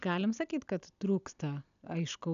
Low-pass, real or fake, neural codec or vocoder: 7.2 kHz; real; none